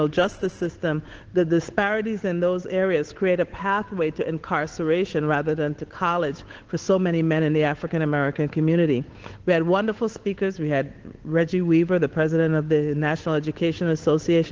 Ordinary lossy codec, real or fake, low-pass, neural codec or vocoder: Opus, 16 kbps; fake; 7.2 kHz; codec, 16 kHz, 8 kbps, FunCodec, trained on Chinese and English, 25 frames a second